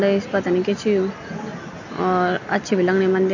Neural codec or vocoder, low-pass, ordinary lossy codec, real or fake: none; 7.2 kHz; none; real